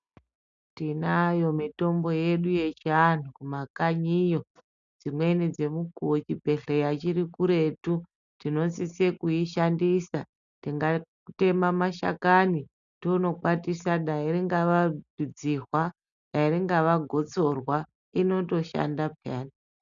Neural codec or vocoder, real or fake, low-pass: none; real; 7.2 kHz